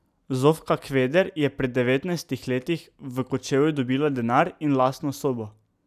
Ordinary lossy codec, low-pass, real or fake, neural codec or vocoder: none; 14.4 kHz; real; none